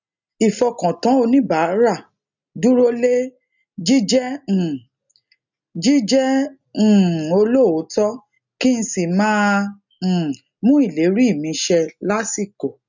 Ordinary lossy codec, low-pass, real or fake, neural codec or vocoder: none; 7.2 kHz; real; none